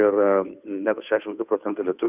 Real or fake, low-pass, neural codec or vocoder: fake; 3.6 kHz; codec, 16 kHz, 2 kbps, FunCodec, trained on Chinese and English, 25 frames a second